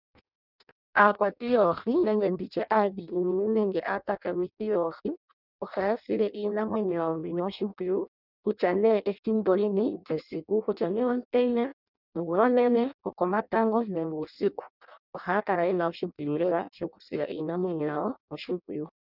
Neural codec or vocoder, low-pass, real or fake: codec, 16 kHz in and 24 kHz out, 0.6 kbps, FireRedTTS-2 codec; 5.4 kHz; fake